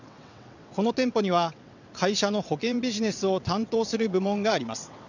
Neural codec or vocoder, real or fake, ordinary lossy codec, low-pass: vocoder, 22.05 kHz, 80 mel bands, WaveNeXt; fake; none; 7.2 kHz